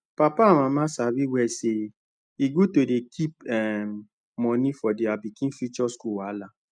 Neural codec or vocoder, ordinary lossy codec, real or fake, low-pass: none; none; real; 9.9 kHz